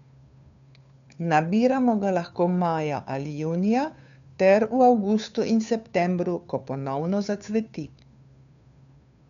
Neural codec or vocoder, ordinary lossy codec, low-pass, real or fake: codec, 16 kHz, 2 kbps, FunCodec, trained on Chinese and English, 25 frames a second; none; 7.2 kHz; fake